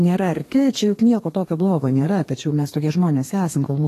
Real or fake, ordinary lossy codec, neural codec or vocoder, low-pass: fake; AAC, 48 kbps; codec, 32 kHz, 1.9 kbps, SNAC; 14.4 kHz